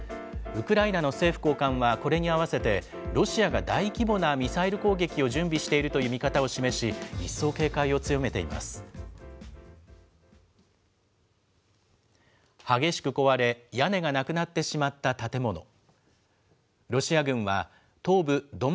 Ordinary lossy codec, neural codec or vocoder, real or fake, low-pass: none; none; real; none